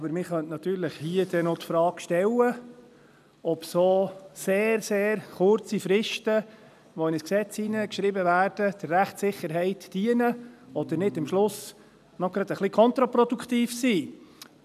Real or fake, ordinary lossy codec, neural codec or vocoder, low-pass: real; none; none; 14.4 kHz